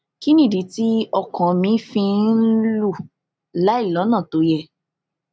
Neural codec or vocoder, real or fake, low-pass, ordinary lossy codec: none; real; none; none